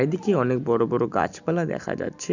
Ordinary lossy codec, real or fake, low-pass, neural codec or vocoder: none; real; 7.2 kHz; none